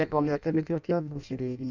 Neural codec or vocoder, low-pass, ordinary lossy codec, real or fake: codec, 16 kHz in and 24 kHz out, 0.6 kbps, FireRedTTS-2 codec; 7.2 kHz; none; fake